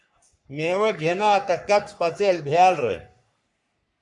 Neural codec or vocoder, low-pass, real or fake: codec, 44.1 kHz, 3.4 kbps, Pupu-Codec; 10.8 kHz; fake